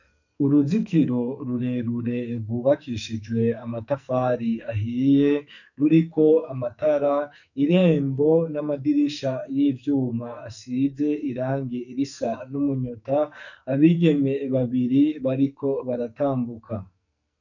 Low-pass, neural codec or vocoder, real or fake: 7.2 kHz; codec, 44.1 kHz, 2.6 kbps, SNAC; fake